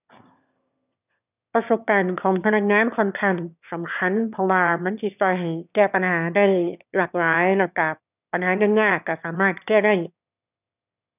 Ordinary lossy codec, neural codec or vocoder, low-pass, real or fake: none; autoencoder, 22.05 kHz, a latent of 192 numbers a frame, VITS, trained on one speaker; 3.6 kHz; fake